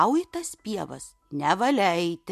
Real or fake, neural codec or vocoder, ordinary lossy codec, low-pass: real; none; MP3, 64 kbps; 14.4 kHz